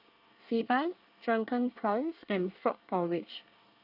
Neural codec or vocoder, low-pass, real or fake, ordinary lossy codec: codec, 24 kHz, 1 kbps, SNAC; 5.4 kHz; fake; none